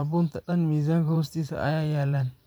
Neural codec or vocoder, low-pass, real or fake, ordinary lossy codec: vocoder, 44.1 kHz, 128 mel bands every 512 samples, BigVGAN v2; none; fake; none